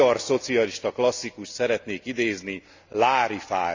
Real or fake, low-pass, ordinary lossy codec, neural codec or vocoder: real; 7.2 kHz; Opus, 64 kbps; none